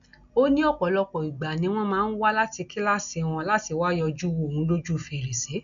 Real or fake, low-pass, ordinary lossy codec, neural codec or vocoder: real; 7.2 kHz; none; none